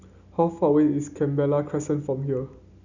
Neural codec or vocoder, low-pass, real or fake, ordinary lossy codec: none; 7.2 kHz; real; none